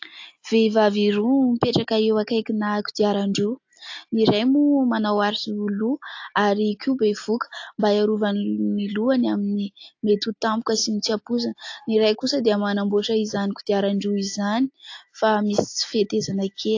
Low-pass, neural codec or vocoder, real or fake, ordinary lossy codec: 7.2 kHz; none; real; AAC, 48 kbps